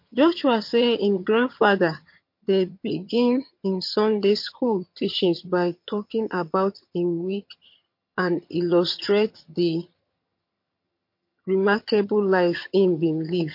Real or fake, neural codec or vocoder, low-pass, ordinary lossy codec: fake; vocoder, 22.05 kHz, 80 mel bands, HiFi-GAN; 5.4 kHz; MP3, 32 kbps